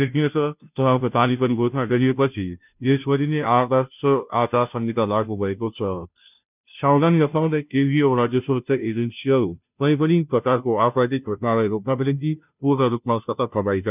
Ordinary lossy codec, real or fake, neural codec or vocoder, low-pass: none; fake; codec, 16 kHz, 0.5 kbps, FunCodec, trained on Chinese and English, 25 frames a second; 3.6 kHz